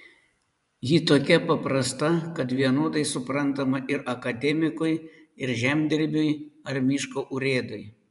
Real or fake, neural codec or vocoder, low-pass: fake; vocoder, 24 kHz, 100 mel bands, Vocos; 10.8 kHz